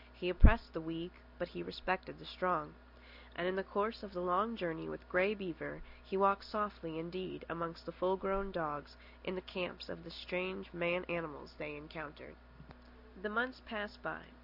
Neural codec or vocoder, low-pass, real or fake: none; 5.4 kHz; real